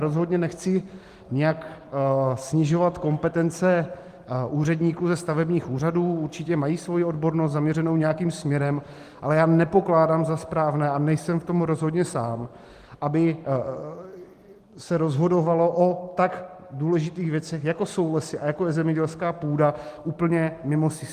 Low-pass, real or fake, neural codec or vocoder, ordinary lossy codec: 14.4 kHz; real; none; Opus, 24 kbps